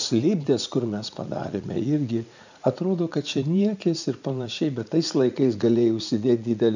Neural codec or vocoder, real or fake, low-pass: vocoder, 44.1 kHz, 80 mel bands, Vocos; fake; 7.2 kHz